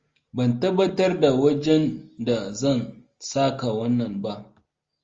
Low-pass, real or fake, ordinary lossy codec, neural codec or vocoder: 7.2 kHz; real; Opus, 32 kbps; none